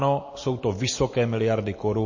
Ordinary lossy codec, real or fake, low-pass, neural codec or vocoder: MP3, 32 kbps; fake; 7.2 kHz; vocoder, 44.1 kHz, 128 mel bands every 256 samples, BigVGAN v2